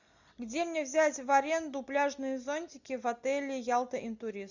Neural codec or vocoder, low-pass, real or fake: none; 7.2 kHz; real